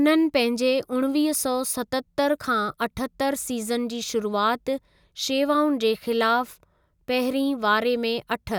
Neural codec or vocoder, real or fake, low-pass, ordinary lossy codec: none; real; none; none